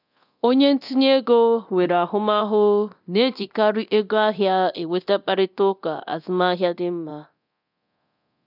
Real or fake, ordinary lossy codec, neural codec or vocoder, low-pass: fake; none; codec, 24 kHz, 0.9 kbps, DualCodec; 5.4 kHz